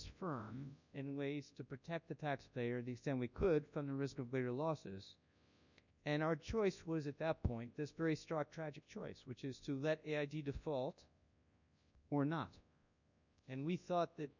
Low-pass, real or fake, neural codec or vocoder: 7.2 kHz; fake; codec, 24 kHz, 0.9 kbps, WavTokenizer, large speech release